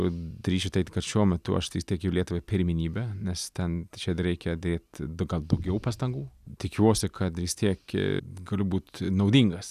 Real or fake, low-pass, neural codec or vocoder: real; 14.4 kHz; none